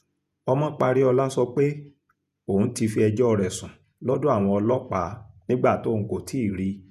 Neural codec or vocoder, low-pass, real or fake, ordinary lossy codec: vocoder, 48 kHz, 128 mel bands, Vocos; 14.4 kHz; fake; none